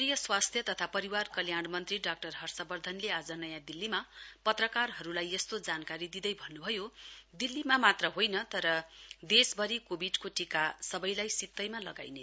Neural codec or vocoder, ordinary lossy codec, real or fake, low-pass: none; none; real; none